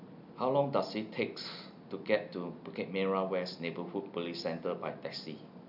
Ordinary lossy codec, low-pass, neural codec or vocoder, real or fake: none; 5.4 kHz; none; real